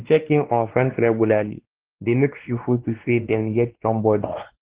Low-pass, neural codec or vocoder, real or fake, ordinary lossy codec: 3.6 kHz; codec, 16 kHz, 2 kbps, X-Codec, WavLM features, trained on Multilingual LibriSpeech; fake; Opus, 16 kbps